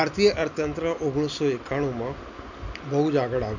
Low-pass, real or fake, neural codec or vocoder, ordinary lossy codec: 7.2 kHz; real; none; none